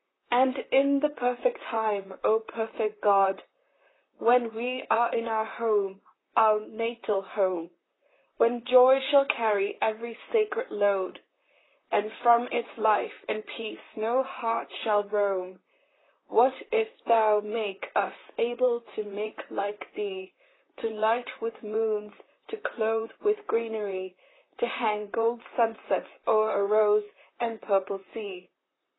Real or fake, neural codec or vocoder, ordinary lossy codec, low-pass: fake; vocoder, 44.1 kHz, 128 mel bands, Pupu-Vocoder; AAC, 16 kbps; 7.2 kHz